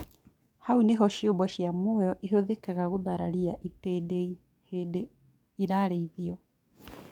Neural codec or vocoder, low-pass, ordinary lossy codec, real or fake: codec, 44.1 kHz, 7.8 kbps, DAC; 19.8 kHz; MP3, 96 kbps; fake